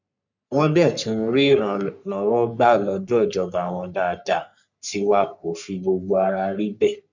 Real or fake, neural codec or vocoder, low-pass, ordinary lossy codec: fake; codec, 44.1 kHz, 3.4 kbps, Pupu-Codec; 7.2 kHz; none